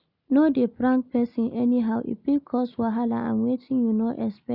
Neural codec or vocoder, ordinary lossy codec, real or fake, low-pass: none; none; real; 5.4 kHz